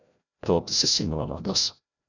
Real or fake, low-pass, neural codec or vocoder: fake; 7.2 kHz; codec, 16 kHz, 0.5 kbps, FreqCodec, larger model